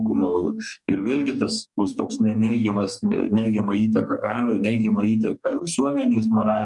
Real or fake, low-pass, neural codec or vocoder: fake; 10.8 kHz; codec, 44.1 kHz, 2.6 kbps, DAC